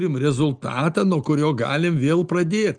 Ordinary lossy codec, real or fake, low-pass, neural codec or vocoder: Opus, 32 kbps; real; 9.9 kHz; none